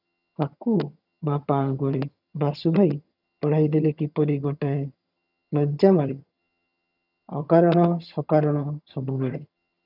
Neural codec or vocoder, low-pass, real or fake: vocoder, 22.05 kHz, 80 mel bands, HiFi-GAN; 5.4 kHz; fake